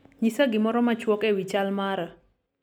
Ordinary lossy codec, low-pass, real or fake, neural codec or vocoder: none; 19.8 kHz; real; none